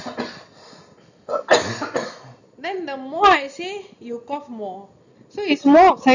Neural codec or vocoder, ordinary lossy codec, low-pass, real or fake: none; none; 7.2 kHz; real